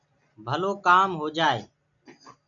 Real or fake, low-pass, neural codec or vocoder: real; 7.2 kHz; none